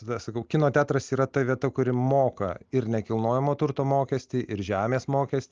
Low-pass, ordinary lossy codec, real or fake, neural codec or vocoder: 7.2 kHz; Opus, 24 kbps; real; none